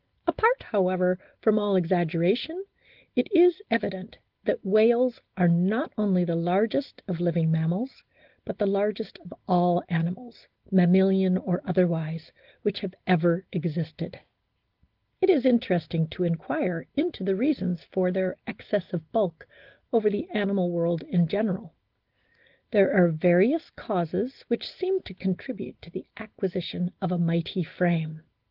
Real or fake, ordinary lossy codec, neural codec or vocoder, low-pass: real; Opus, 16 kbps; none; 5.4 kHz